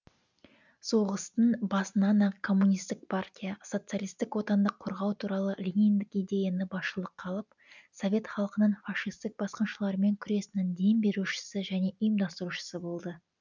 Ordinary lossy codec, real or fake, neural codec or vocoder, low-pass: none; real; none; 7.2 kHz